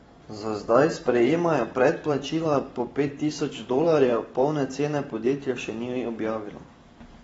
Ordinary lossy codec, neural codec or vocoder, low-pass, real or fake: AAC, 24 kbps; vocoder, 44.1 kHz, 128 mel bands every 512 samples, BigVGAN v2; 19.8 kHz; fake